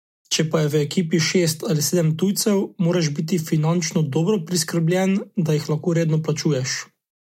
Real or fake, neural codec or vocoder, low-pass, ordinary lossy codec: real; none; 19.8 kHz; MP3, 64 kbps